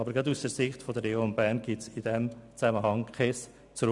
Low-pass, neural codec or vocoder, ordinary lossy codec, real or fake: 10.8 kHz; none; none; real